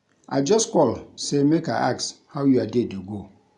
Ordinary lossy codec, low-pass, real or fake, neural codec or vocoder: none; 10.8 kHz; real; none